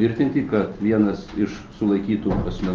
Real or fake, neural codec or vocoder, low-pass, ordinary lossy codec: real; none; 7.2 kHz; Opus, 24 kbps